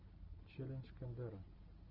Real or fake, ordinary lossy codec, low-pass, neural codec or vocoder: real; Opus, 24 kbps; 5.4 kHz; none